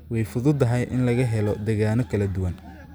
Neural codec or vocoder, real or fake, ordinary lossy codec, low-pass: none; real; none; none